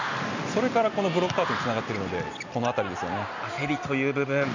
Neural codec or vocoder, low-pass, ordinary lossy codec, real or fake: none; 7.2 kHz; none; real